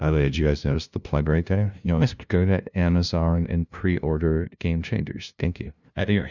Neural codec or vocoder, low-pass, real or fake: codec, 16 kHz, 0.5 kbps, FunCodec, trained on LibriTTS, 25 frames a second; 7.2 kHz; fake